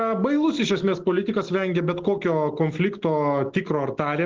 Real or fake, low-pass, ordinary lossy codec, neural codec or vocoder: real; 7.2 kHz; Opus, 16 kbps; none